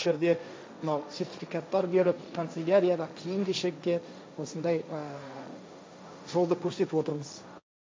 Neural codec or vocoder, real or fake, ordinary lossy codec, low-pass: codec, 16 kHz, 1.1 kbps, Voila-Tokenizer; fake; none; none